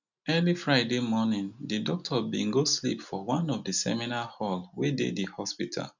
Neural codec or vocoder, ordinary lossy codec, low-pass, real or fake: none; none; 7.2 kHz; real